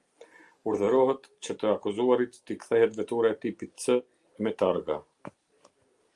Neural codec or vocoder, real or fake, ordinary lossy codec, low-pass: none; real; Opus, 32 kbps; 10.8 kHz